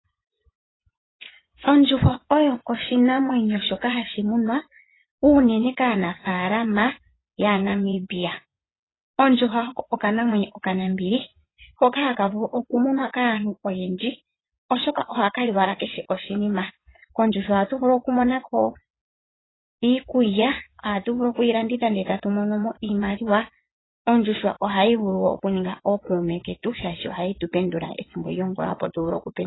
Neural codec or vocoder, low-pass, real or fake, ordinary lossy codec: vocoder, 24 kHz, 100 mel bands, Vocos; 7.2 kHz; fake; AAC, 16 kbps